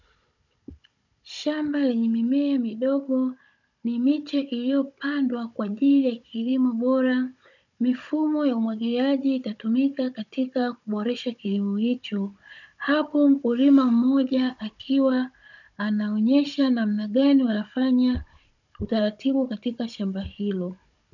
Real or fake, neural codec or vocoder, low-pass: fake; codec, 16 kHz, 16 kbps, FunCodec, trained on Chinese and English, 50 frames a second; 7.2 kHz